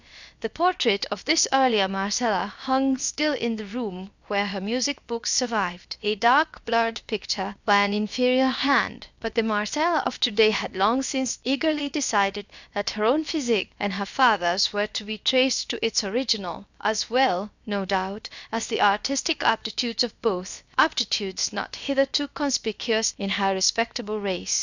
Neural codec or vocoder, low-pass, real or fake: codec, 16 kHz, about 1 kbps, DyCAST, with the encoder's durations; 7.2 kHz; fake